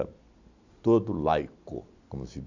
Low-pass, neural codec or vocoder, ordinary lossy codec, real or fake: 7.2 kHz; none; none; real